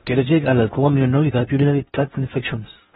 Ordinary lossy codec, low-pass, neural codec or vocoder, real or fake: AAC, 16 kbps; 10.8 kHz; codec, 16 kHz in and 24 kHz out, 0.6 kbps, FocalCodec, streaming, 4096 codes; fake